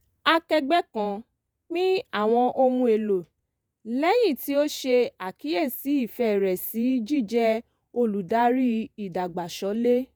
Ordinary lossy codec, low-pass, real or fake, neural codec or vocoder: none; none; fake; vocoder, 48 kHz, 128 mel bands, Vocos